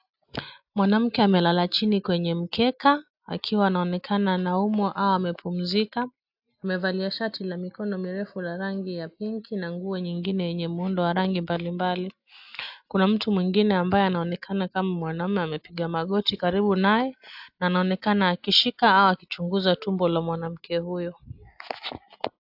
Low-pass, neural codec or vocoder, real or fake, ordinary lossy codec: 5.4 kHz; none; real; AAC, 48 kbps